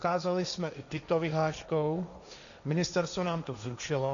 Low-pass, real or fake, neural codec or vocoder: 7.2 kHz; fake; codec, 16 kHz, 1.1 kbps, Voila-Tokenizer